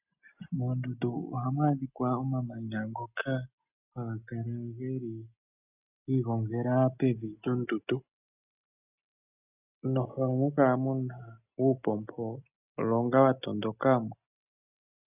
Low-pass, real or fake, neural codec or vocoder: 3.6 kHz; real; none